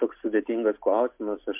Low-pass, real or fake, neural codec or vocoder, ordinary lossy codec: 3.6 kHz; real; none; MP3, 32 kbps